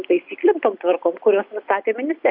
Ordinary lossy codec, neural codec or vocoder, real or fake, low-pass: AAC, 48 kbps; none; real; 5.4 kHz